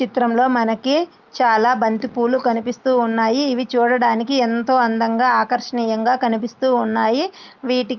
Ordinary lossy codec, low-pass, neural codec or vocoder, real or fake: Opus, 24 kbps; 7.2 kHz; none; real